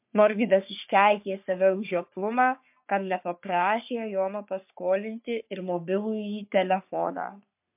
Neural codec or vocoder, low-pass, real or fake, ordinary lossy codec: codec, 44.1 kHz, 3.4 kbps, Pupu-Codec; 3.6 kHz; fake; MP3, 32 kbps